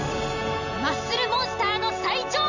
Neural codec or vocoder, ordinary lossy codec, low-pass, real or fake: none; none; 7.2 kHz; real